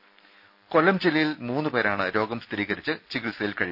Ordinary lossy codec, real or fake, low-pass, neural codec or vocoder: none; real; 5.4 kHz; none